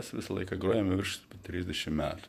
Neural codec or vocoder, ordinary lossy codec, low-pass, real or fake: vocoder, 48 kHz, 128 mel bands, Vocos; MP3, 96 kbps; 14.4 kHz; fake